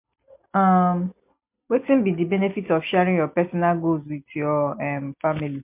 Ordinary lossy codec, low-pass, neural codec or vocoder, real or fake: MP3, 32 kbps; 3.6 kHz; none; real